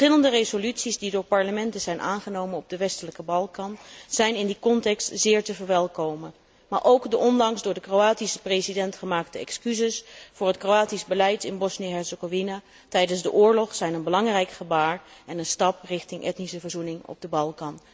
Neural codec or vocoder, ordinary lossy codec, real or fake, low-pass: none; none; real; none